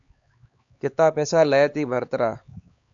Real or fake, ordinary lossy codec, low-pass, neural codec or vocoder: fake; AAC, 64 kbps; 7.2 kHz; codec, 16 kHz, 4 kbps, X-Codec, HuBERT features, trained on LibriSpeech